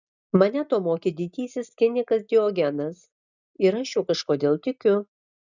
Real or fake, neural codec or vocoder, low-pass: real; none; 7.2 kHz